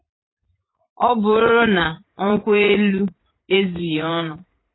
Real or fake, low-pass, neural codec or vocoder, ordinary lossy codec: fake; 7.2 kHz; vocoder, 44.1 kHz, 80 mel bands, Vocos; AAC, 16 kbps